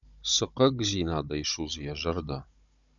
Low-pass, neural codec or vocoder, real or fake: 7.2 kHz; codec, 16 kHz, 16 kbps, FunCodec, trained on Chinese and English, 50 frames a second; fake